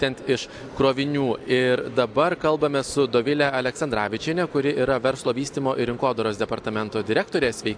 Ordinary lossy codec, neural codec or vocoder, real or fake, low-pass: AAC, 64 kbps; none; real; 9.9 kHz